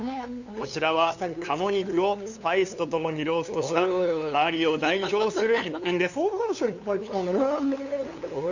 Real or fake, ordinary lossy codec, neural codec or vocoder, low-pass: fake; none; codec, 16 kHz, 2 kbps, FunCodec, trained on LibriTTS, 25 frames a second; 7.2 kHz